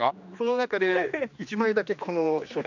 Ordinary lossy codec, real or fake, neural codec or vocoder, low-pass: none; fake; codec, 16 kHz, 2 kbps, X-Codec, HuBERT features, trained on general audio; 7.2 kHz